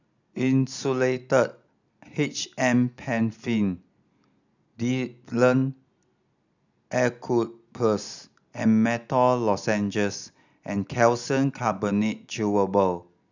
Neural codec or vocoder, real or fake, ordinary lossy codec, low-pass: none; real; none; 7.2 kHz